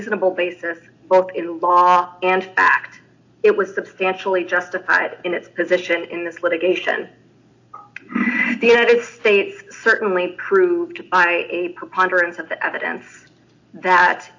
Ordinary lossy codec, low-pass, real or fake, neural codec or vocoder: AAC, 48 kbps; 7.2 kHz; real; none